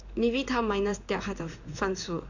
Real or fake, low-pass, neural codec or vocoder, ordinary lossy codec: fake; 7.2 kHz; codec, 16 kHz in and 24 kHz out, 1 kbps, XY-Tokenizer; none